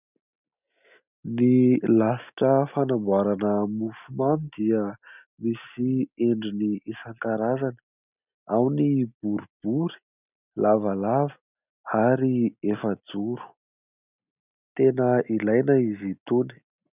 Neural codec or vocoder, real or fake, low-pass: none; real; 3.6 kHz